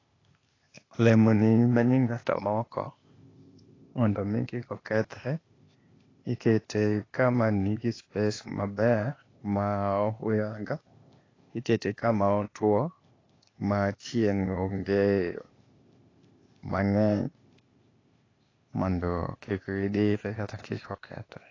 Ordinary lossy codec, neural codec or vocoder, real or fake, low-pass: AAC, 32 kbps; codec, 16 kHz, 0.8 kbps, ZipCodec; fake; 7.2 kHz